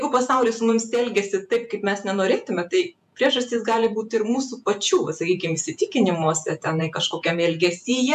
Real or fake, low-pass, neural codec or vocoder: fake; 14.4 kHz; vocoder, 48 kHz, 128 mel bands, Vocos